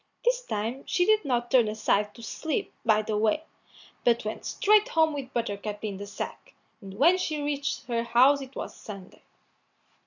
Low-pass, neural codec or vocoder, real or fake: 7.2 kHz; none; real